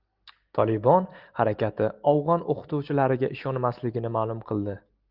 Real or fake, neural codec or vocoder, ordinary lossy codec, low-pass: real; none; Opus, 24 kbps; 5.4 kHz